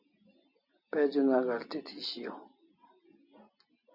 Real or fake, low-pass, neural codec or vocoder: real; 5.4 kHz; none